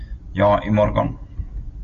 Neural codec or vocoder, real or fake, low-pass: none; real; 7.2 kHz